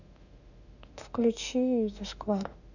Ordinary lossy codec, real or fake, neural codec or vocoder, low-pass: none; fake; autoencoder, 48 kHz, 32 numbers a frame, DAC-VAE, trained on Japanese speech; 7.2 kHz